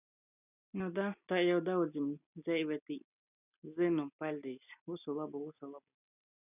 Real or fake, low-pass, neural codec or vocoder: real; 3.6 kHz; none